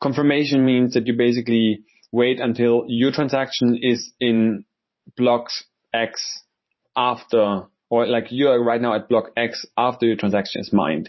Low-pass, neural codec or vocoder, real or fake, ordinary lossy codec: 7.2 kHz; vocoder, 44.1 kHz, 128 mel bands every 512 samples, BigVGAN v2; fake; MP3, 24 kbps